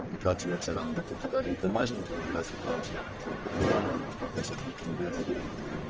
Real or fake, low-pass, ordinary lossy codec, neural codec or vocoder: fake; 7.2 kHz; Opus, 24 kbps; codec, 44.1 kHz, 1.7 kbps, Pupu-Codec